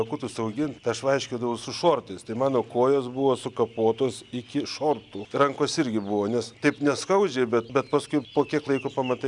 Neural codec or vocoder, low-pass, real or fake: none; 10.8 kHz; real